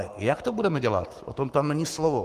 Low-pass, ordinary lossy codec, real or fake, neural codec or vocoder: 14.4 kHz; Opus, 24 kbps; fake; codec, 44.1 kHz, 7.8 kbps, Pupu-Codec